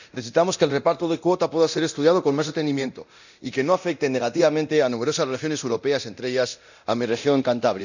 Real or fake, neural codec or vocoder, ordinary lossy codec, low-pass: fake; codec, 24 kHz, 0.9 kbps, DualCodec; none; 7.2 kHz